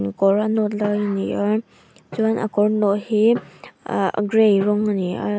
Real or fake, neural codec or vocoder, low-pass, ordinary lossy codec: real; none; none; none